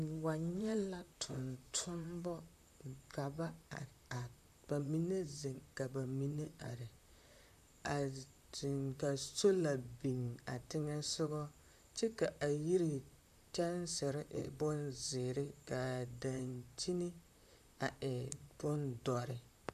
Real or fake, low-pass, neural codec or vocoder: fake; 14.4 kHz; vocoder, 44.1 kHz, 128 mel bands, Pupu-Vocoder